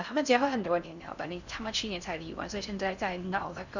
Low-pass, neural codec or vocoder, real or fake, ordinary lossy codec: 7.2 kHz; codec, 16 kHz in and 24 kHz out, 0.6 kbps, FocalCodec, streaming, 4096 codes; fake; none